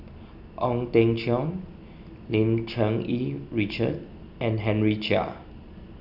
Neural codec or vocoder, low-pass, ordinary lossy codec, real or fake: none; 5.4 kHz; none; real